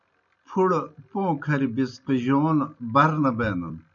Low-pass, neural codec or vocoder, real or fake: 7.2 kHz; none; real